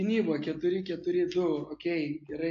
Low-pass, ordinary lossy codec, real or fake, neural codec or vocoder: 7.2 kHz; AAC, 48 kbps; real; none